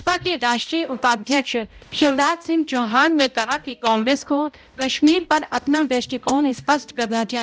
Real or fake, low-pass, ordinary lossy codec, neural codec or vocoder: fake; none; none; codec, 16 kHz, 0.5 kbps, X-Codec, HuBERT features, trained on balanced general audio